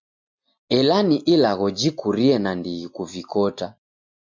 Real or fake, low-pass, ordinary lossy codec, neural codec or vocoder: real; 7.2 kHz; MP3, 64 kbps; none